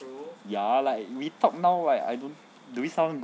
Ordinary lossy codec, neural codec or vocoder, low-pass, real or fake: none; none; none; real